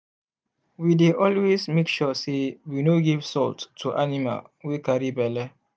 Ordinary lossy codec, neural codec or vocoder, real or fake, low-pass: none; none; real; none